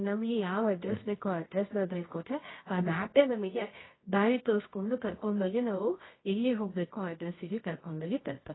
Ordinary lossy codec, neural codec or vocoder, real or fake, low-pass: AAC, 16 kbps; codec, 24 kHz, 0.9 kbps, WavTokenizer, medium music audio release; fake; 7.2 kHz